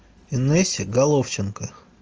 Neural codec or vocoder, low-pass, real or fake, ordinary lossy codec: none; 7.2 kHz; real; Opus, 24 kbps